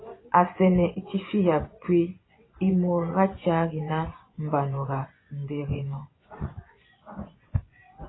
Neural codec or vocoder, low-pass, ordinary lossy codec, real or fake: vocoder, 24 kHz, 100 mel bands, Vocos; 7.2 kHz; AAC, 16 kbps; fake